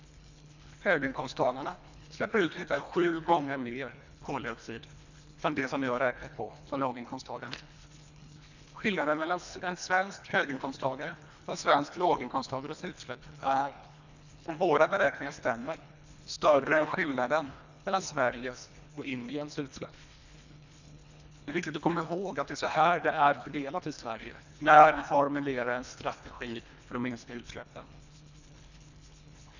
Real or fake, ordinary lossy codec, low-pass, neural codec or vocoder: fake; none; 7.2 kHz; codec, 24 kHz, 1.5 kbps, HILCodec